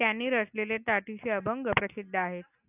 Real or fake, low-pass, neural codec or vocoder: real; 3.6 kHz; none